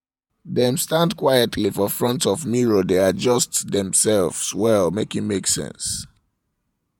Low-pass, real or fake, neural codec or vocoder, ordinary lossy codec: 19.8 kHz; fake; vocoder, 48 kHz, 128 mel bands, Vocos; none